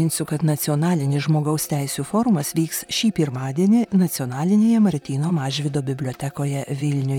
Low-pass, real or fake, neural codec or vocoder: 19.8 kHz; fake; vocoder, 44.1 kHz, 128 mel bands, Pupu-Vocoder